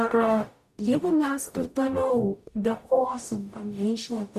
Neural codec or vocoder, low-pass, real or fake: codec, 44.1 kHz, 0.9 kbps, DAC; 14.4 kHz; fake